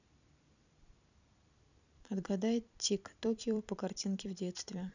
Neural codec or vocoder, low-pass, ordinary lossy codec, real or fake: vocoder, 44.1 kHz, 128 mel bands every 256 samples, BigVGAN v2; 7.2 kHz; none; fake